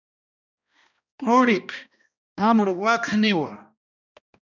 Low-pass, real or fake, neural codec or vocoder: 7.2 kHz; fake; codec, 16 kHz, 1 kbps, X-Codec, HuBERT features, trained on balanced general audio